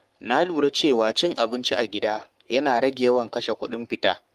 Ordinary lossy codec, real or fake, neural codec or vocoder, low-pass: Opus, 32 kbps; fake; codec, 44.1 kHz, 3.4 kbps, Pupu-Codec; 14.4 kHz